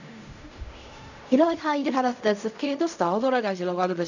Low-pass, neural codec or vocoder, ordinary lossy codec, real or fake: 7.2 kHz; codec, 16 kHz in and 24 kHz out, 0.4 kbps, LongCat-Audio-Codec, fine tuned four codebook decoder; none; fake